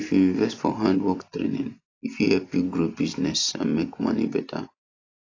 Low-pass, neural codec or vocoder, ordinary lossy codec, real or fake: 7.2 kHz; none; AAC, 32 kbps; real